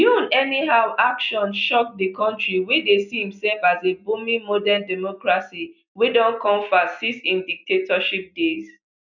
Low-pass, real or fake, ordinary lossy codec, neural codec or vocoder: 7.2 kHz; real; none; none